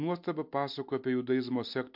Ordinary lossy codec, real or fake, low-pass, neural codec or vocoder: MP3, 48 kbps; real; 5.4 kHz; none